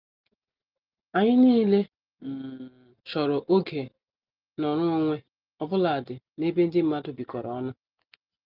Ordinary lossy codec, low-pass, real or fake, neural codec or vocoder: Opus, 24 kbps; 5.4 kHz; real; none